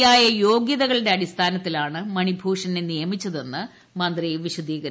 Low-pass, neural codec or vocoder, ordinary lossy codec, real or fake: none; none; none; real